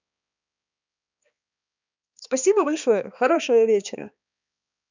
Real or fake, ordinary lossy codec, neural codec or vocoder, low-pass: fake; none; codec, 16 kHz, 2 kbps, X-Codec, HuBERT features, trained on balanced general audio; 7.2 kHz